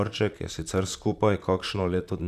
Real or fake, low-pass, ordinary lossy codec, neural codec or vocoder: fake; 14.4 kHz; none; vocoder, 44.1 kHz, 128 mel bands, Pupu-Vocoder